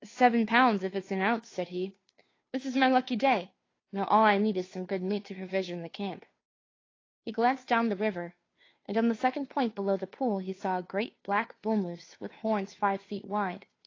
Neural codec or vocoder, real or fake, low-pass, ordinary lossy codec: codec, 16 kHz, 2 kbps, FunCodec, trained on Chinese and English, 25 frames a second; fake; 7.2 kHz; AAC, 32 kbps